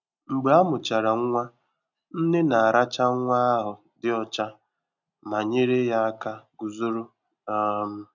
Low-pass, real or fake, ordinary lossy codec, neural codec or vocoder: 7.2 kHz; real; none; none